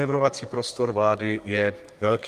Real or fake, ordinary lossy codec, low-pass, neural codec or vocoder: fake; Opus, 32 kbps; 14.4 kHz; codec, 32 kHz, 1.9 kbps, SNAC